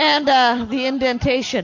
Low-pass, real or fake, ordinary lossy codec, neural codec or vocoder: 7.2 kHz; real; MP3, 48 kbps; none